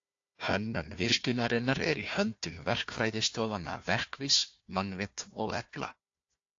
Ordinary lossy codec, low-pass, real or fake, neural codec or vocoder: AAC, 48 kbps; 7.2 kHz; fake; codec, 16 kHz, 1 kbps, FunCodec, trained on Chinese and English, 50 frames a second